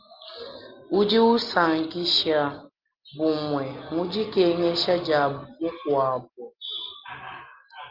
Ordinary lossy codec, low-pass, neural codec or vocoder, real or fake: Opus, 24 kbps; 5.4 kHz; none; real